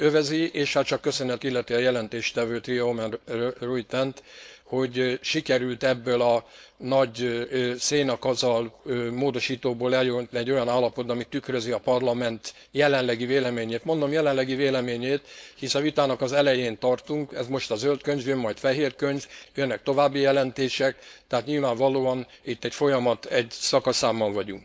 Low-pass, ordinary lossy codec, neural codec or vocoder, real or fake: none; none; codec, 16 kHz, 4.8 kbps, FACodec; fake